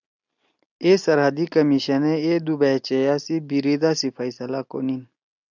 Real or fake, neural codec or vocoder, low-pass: real; none; 7.2 kHz